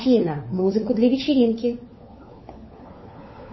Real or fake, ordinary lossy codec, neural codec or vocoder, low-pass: fake; MP3, 24 kbps; codec, 16 kHz, 4 kbps, FunCodec, trained on LibriTTS, 50 frames a second; 7.2 kHz